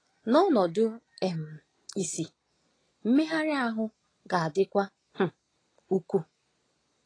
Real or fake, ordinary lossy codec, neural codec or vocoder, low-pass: real; AAC, 32 kbps; none; 9.9 kHz